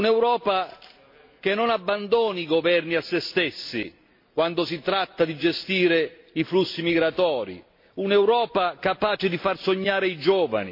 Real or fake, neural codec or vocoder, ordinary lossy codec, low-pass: real; none; MP3, 32 kbps; 5.4 kHz